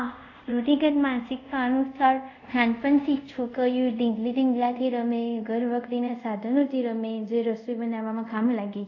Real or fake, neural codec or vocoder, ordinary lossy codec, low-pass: fake; codec, 24 kHz, 0.5 kbps, DualCodec; AAC, 48 kbps; 7.2 kHz